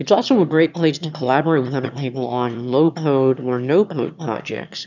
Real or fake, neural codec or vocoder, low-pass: fake; autoencoder, 22.05 kHz, a latent of 192 numbers a frame, VITS, trained on one speaker; 7.2 kHz